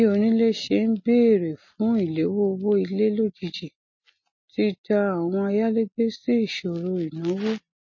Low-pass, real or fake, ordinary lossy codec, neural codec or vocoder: 7.2 kHz; real; MP3, 32 kbps; none